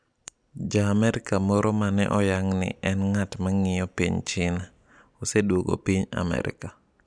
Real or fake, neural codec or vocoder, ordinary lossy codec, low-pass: real; none; none; 9.9 kHz